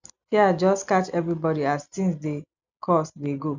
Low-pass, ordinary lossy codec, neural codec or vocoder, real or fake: 7.2 kHz; none; none; real